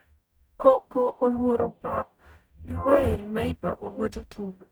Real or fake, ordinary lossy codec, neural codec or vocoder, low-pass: fake; none; codec, 44.1 kHz, 0.9 kbps, DAC; none